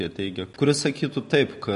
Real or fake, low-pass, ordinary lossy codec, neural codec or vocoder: real; 14.4 kHz; MP3, 48 kbps; none